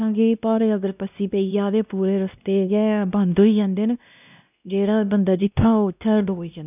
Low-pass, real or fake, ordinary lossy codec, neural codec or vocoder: 3.6 kHz; fake; none; codec, 16 kHz, 1 kbps, X-Codec, WavLM features, trained on Multilingual LibriSpeech